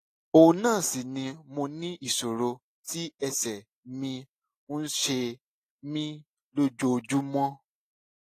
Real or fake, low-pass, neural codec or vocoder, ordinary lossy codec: real; 14.4 kHz; none; AAC, 48 kbps